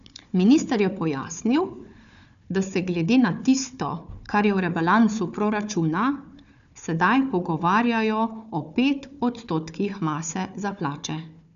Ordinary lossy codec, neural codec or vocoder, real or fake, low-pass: none; codec, 16 kHz, 4 kbps, FunCodec, trained on Chinese and English, 50 frames a second; fake; 7.2 kHz